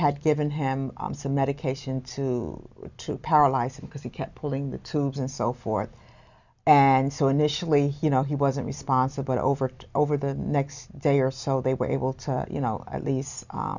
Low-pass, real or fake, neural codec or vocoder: 7.2 kHz; real; none